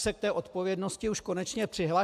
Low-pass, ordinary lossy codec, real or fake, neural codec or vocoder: 14.4 kHz; AAC, 96 kbps; real; none